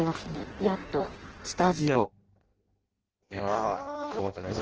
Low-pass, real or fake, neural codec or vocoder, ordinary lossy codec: 7.2 kHz; fake; codec, 16 kHz in and 24 kHz out, 0.6 kbps, FireRedTTS-2 codec; Opus, 16 kbps